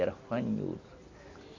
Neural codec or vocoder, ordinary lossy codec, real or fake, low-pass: none; MP3, 64 kbps; real; 7.2 kHz